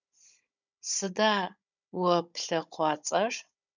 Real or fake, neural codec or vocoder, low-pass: fake; codec, 16 kHz, 16 kbps, FunCodec, trained on Chinese and English, 50 frames a second; 7.2 kHz